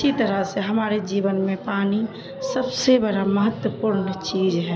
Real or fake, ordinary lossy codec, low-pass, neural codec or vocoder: real; none; none; none